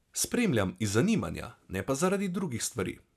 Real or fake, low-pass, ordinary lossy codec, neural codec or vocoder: real; 14.4 kHz; none; none